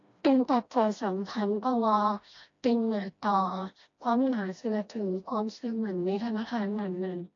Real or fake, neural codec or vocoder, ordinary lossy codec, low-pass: fake; codec, 16 kHz, 1 kbps, FreqCodec, smaller model; AAC, 48 kbps; 7.2 kHz